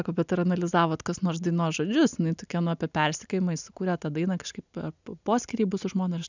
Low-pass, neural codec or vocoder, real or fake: 7.2 kHz; none; real